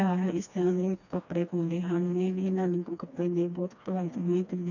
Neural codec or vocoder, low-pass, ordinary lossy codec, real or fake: codec, 16 kHz, 2 kbps, FreqCodec, smaller model; 7.2 kHz; none; fake